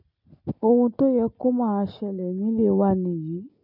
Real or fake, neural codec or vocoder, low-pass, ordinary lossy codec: real; none; 5.4 kHz; none